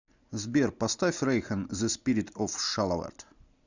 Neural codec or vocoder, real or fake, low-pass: none; real; 7.2 kHz